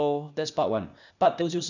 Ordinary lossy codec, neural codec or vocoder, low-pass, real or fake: none; codec, 16 kHz, 0.8 kbps, ZipCodec; 7.2 kHz; fake